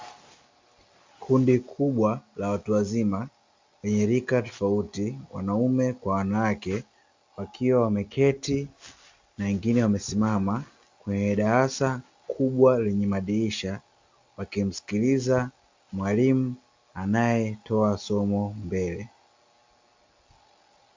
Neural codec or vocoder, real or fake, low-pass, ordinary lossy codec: none; real; 7.2 kHz; MP3, 64 kbps